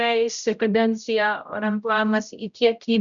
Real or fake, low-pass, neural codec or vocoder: fake; 7.2 kHz; codec, 16 kHz, 0.5 kbps, X-Codec, HuBERT features, trained on general audio